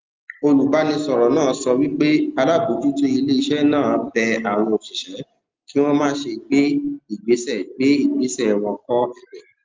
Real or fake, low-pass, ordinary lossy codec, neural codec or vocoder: real; 7.2 kHz; Opus, 32 kbps; none